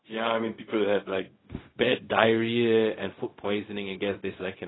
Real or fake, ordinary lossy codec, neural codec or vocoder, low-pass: fake; AAC, 16 kbps; codec, 16 kHz, 0.4 kbps, LongCat-Audio-Codec; 7.2 kHz